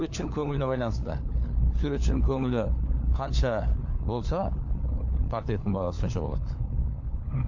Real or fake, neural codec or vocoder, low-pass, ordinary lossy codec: fake; codec, 16 kHz, 4 kbps, FunCodec, trained on LibriTTS, 50 frames a second; 7.2 kHz; AAC, 48 kbps